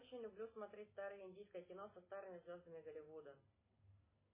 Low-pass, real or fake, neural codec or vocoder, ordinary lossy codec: 3.6 kHz; real; none; MP3, 16 kbps